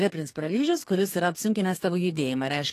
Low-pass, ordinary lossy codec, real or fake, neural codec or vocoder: 14.4 kHz; AAC, 48 kbps; fake; codec, 32 kHz, 1.9 kbps, SNAC